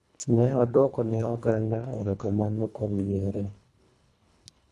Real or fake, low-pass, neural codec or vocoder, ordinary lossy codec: fake; none; codec, 24 kHz, 1.5 kbps, HILCodec; none